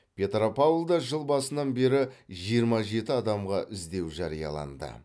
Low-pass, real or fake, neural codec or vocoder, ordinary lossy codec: none; real; none; none